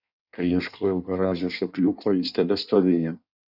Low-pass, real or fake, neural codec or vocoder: 5.4 kHz; fake; codec, 16 kHz in and 24 kHz out, 1.1 kbps, FireRedTTS-2 codec